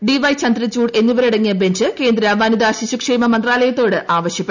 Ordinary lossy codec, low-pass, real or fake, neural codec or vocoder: none; 7.2 kHz; real; none